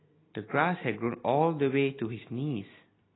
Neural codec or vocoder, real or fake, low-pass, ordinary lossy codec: none; real; 7.2 kHz; AAC, 16 kbps